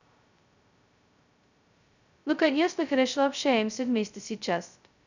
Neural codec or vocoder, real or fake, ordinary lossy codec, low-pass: codec, 16 kHz, 0.2 kbps, FocalCodec; fake; none; 7.2 kHz